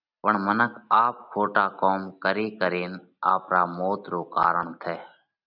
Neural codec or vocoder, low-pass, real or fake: none; 5.4 kHz; real